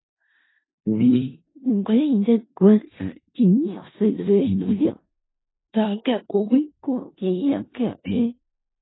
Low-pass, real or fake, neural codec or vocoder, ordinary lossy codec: 7.2 kHz; fake; codec, 16 kHz in and 24 kHz out, 0.4 kbps, LongCat-Audio-Codec, four codebook decoder; AAC, 16 kbps